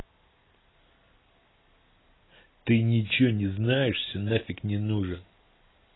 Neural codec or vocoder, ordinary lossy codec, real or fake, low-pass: none; AAC, 16 kbps; real; 7.2 kHz